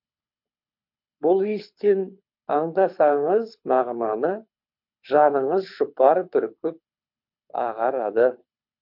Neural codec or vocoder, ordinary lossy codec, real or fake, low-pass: codec, 24 kHz, 6 kbps, HILCodec; MP3, 48 kbps; fake; 5.4 kHz